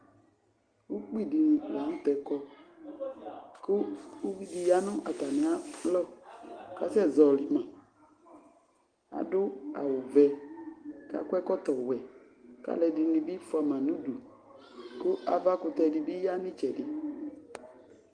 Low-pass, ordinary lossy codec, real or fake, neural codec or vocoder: 9.9 kHz; Opus, 24 kbps; real; none